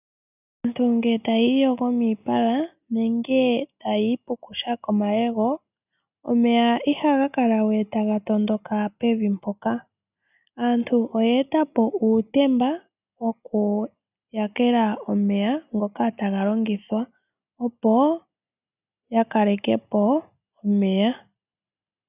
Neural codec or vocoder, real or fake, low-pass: none; real; 3.6 kHz